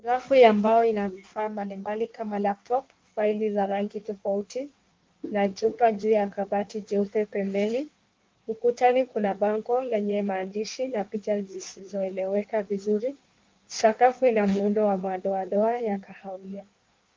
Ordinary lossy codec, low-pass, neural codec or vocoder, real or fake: Opus, 32 kbps; 7.2 kHz; codec, 16 kHz in and 24 kHz out, 1.1 kbps, FireRedTTS-2 codec; fake